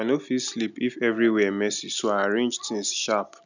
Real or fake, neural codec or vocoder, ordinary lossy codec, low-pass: real; none; none; 7.2 kHz